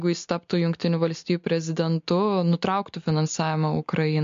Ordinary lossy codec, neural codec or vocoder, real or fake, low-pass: MP3, 48 kbps; none; real; 7.2 kHz